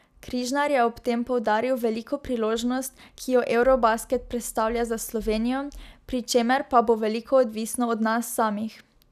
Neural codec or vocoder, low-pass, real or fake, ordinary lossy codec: none; 14.4 kHz; real; none